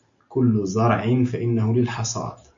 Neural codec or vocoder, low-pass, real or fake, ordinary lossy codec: none; 7.2 kHz; real; AAC, 64 kbps